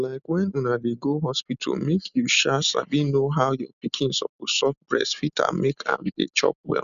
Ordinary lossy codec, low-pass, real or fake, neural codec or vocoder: none; 7.2 kHz; real; none